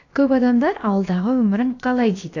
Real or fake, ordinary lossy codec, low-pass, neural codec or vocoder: fake; AAC, 48 kbps; 7.2 kHz; codec, 16 kHz, about 1 kbps, DyCAST, with the encoder's durations